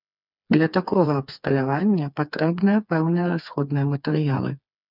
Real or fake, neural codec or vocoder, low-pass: fake; codec, 16 kHz, 4 kbps, FreqCodec, smaller model; 5.4 kHz